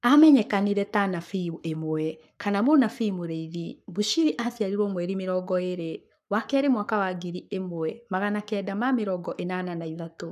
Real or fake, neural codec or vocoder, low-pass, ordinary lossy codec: fake; codec, 44.1 kHz, 7.8 kbps, Pupu-Codec; 14.4 kHz; none